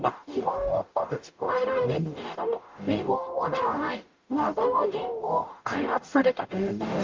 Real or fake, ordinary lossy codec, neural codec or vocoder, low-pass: fake; Opus, 24 kbps; codec, 44.1 kHz, 0.9 kbps, DAC; 7.2 kHz